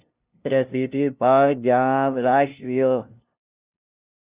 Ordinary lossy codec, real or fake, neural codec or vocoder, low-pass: Opus, 64 kbps; fake; codec, 16 kHz, 0.5 kbps, FunCodec, trained on LibriTTS, 25 frames a second; 3.6 kHz